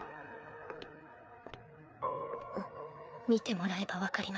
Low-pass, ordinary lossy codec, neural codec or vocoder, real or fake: none; none; codec, 16 kHz, 4 kbps, FreqCodec, larger model; fake